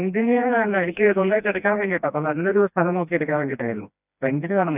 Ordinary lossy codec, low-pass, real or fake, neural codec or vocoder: none; 3.6 kHz; fake; codec, 16 kHz, 1 kbps, FreqCodec, smaller model